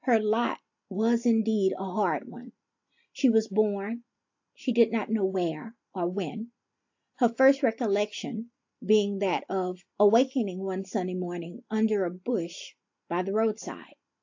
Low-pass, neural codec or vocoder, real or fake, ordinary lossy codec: 7.2 kHz; none; real; AAC, 48 kbps